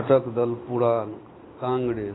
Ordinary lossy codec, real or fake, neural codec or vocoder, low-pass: AAC, 16 kbps; real; none; 7.2 kHz